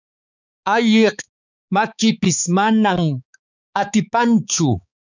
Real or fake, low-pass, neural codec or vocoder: fake; 7.2 kHz; codec, 16 kHz, 4 kbps, X-Codec, HuBERT features, trained on balanced general audio